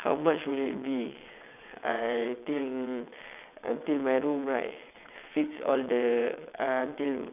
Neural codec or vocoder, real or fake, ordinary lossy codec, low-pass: vocoder, 22.05 kHz, 80 mel bands, WaveNeXt; fake; none; 3.6 kHz